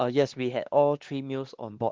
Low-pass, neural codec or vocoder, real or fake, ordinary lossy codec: 7.2 kHz; codec, 16 kHz, 2 kbps, X-Codec, WavLM features, trained on Multilingual LibriSpeech; fake; Opus, 16 kbps